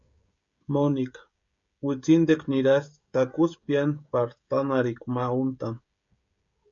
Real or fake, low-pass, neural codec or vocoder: fake; 7.2 kHz; codec, 16 kHz, 16 kbps, FreqCodec, smaller model